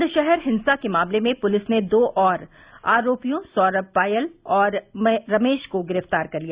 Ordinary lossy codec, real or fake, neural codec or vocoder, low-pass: Opus, 64 kbps; real; none; 3.6 kHz